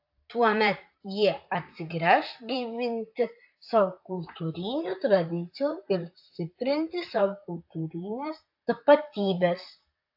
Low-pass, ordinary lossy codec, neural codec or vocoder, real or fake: 5.4 kHz; AAC, 48 kbps; vocoder, 44.1 kHz, 128 mel bands, Pupu-Vocoder; fake